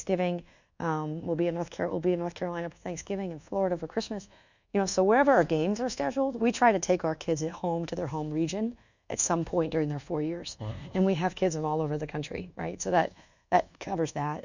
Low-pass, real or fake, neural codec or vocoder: 7.2 kHz; fake; codec, 24 kHz, 1.2 kbps, DualCodec